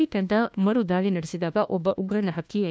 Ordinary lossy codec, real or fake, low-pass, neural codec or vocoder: none; fake; none; codec, 16 kHz, 1 kbps, FunCodec, trained on LibriTTS, 50 frames a second